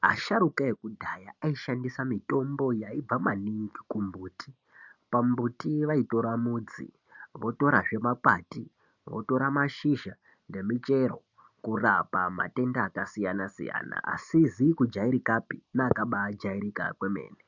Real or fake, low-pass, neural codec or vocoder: real; 7.2 kHz; none